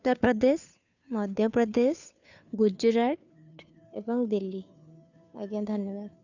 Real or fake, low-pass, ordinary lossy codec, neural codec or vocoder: fake; 7.2 kHz; none; codec, 16 kHz, 2 kbps, FunCodec, trained on Chinese and English, 25 frames a second